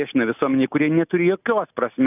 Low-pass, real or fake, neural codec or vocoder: 3.6 kHz; real; none